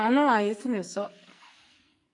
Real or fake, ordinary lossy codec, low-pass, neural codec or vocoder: fake; AAC, 64 kbps; 10.8 kHz; codec, 44.1 kHz, 2.6 kbps, SNAC